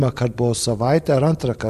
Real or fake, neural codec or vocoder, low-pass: fake; vocoder, 44.1 kHz, 128 mel bands every 512 samples, BigVGAN v2; 14.4 kHz